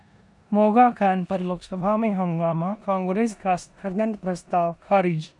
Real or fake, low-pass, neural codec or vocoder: fake; 10.8 kHz; codec, 16 kHz in and 24 kHz out, 0.9 kbps, LongCat-Audio-Codec, four codebook decoder